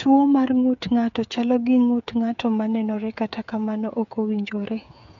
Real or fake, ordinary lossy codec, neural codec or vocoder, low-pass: fake; none; codec, 16 kHz, 8 kbps, FreqCodec, smaller model; 7.2 kHz